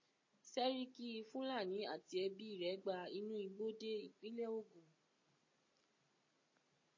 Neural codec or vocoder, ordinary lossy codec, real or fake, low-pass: none; MP3, 32 kbps; real; 7.2 kHz